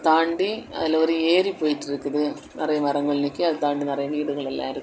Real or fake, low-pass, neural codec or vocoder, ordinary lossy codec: real; none; none; none